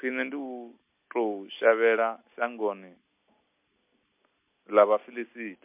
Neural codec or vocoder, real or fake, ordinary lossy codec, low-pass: none; real; MP3, 32 kbps; 3.6 kHz